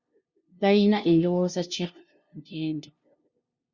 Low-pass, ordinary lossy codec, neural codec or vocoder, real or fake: 7.2 kHz; Opus, 64 kbps; codec, 16 kHz, 0.5 kbps, FunCodec, trained on LibriTTS, 25 frames a second; fake